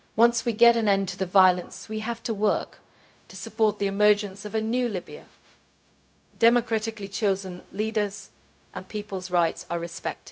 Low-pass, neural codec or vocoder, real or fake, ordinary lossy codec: none; codec, 16 kHz, 0.4 kbps, LongCat-Audio-Codec; fake; none